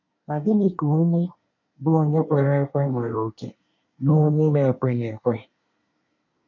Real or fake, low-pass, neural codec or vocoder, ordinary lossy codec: fake; 7.2 kHz; codec, 24 kHz, 1 kbps, SNAC; MP3, 64 kbps